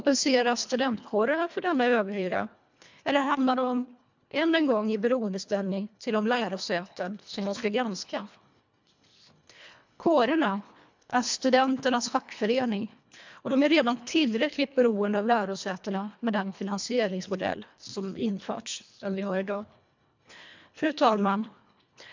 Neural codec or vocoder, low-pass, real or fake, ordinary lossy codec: codec, 24 kHz, 1.5 kbps, HILCodec; 7.2 kHz; fake; MP3, 64 kbps